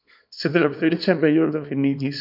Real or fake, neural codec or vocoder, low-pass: fake; codec, 24 kHz, 0.9 kbps, WavTokenizer, small release; 5.4 kHz